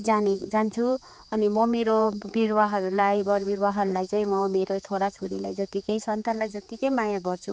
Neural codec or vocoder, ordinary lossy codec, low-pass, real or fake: codec, 16 kHz, 4 kbps, X-Codec, HuBERT features, trained on general audio; none; none; fake